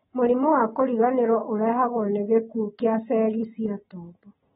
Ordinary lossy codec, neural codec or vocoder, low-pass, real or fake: AAC, 16 kbps; none; 9.9 kHz; real